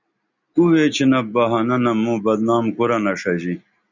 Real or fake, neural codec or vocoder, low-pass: real; none; 7.2 kHz